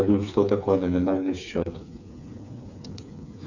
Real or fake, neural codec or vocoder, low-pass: fake; codec, 16 kHz, 4 kbps, FreqCodec, smaller model; 7.2 kHz